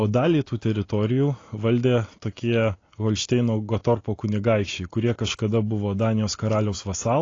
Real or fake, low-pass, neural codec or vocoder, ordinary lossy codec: real; 7.2 kHz; none; AAC, 32 kbps